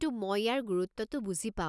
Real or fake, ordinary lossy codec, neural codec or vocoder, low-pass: real; none; none; none